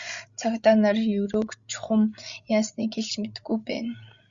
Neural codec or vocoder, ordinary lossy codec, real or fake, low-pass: codec, 16 kHz, 16 kbps, FreqCodec, larger model; Opus, 64 kbps; fake; 7.2 kHz